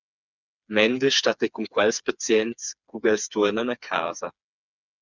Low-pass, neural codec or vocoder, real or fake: 7.2 kHz; codec, 16 kHz, 4 kbps, FreqCodec, smaller model; fake